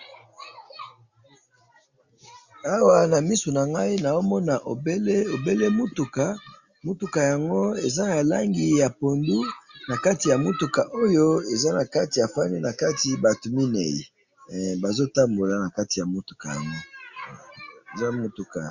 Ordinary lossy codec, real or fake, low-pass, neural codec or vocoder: Opus, 64 kbps; real; 7.2 kHz; none